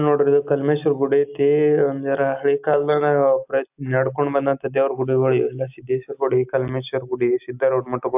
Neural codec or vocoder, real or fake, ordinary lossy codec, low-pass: none; real; AAC, 32 kbps; 3.6 kHz